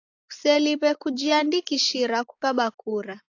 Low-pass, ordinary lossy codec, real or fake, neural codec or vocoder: 7.2 kHz; AAC, 48 kbps; real; none